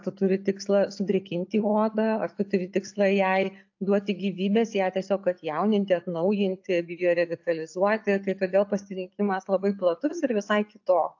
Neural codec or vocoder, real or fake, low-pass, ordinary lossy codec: none; real; 7.2 kHz; AAC, 48 kbps